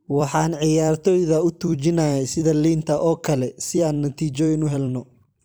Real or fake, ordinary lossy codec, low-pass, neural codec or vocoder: fake; none; none; vocoder, 44.1 kHz, 128 mel bands every 256 samples, BigVGAN v2